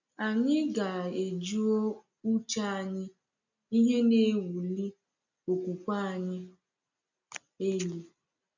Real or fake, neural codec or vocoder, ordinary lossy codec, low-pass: real; none; none; 7.2 kHz